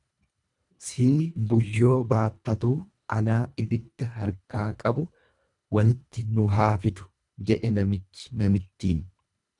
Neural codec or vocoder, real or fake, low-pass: codec, 24 kHz, 1.5 kbps, HILCodec; fake; 10.8 kHz